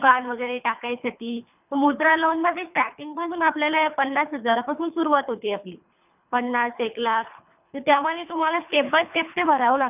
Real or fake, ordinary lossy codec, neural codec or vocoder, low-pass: fake; none; codec, 24 kHz, 3 kbps, HILCodec; 3.6 kHz